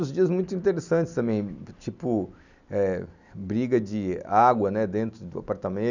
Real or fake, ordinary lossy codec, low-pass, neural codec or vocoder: real; none; 7.2 kHz; none